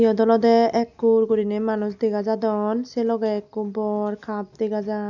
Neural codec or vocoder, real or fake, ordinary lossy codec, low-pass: none; real; none; 7.2 kHz